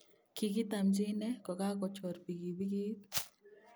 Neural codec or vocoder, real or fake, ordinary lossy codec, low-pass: none; real; none; none